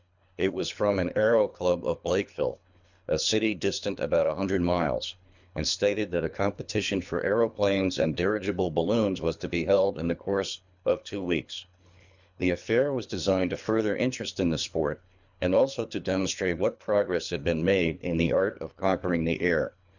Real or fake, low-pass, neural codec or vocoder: fake; 7.2 kHz; codec, 24 kHz, 3 kbps, HILCodec